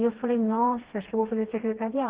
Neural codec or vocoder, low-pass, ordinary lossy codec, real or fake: codec, 16 kHz, 2 kbps, FreqCodec, smaller model; 3.6 kHz; Opus, 16 kbps; fake